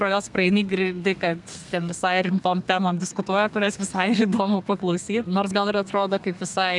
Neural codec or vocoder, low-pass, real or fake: codec, 32 kHz, 1.9 kbps, SNAC; 10.8 kHz; fake